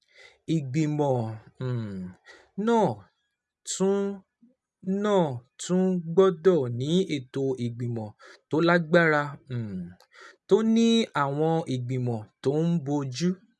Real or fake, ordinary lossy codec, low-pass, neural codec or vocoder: real; none; none; none